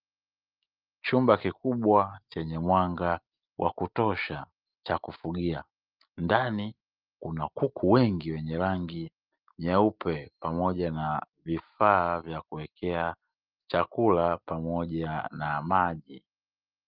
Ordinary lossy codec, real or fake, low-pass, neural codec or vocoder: Opus, 32 kbps; real; 5.4 kHz; none